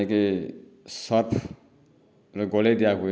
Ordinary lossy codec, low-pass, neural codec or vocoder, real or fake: none; none; none; real